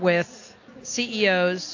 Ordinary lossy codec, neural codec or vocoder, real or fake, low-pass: AAC, 48 kbps; none; real; 7.2 kHz